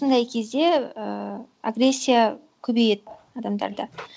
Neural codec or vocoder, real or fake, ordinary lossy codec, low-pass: none; real; none; none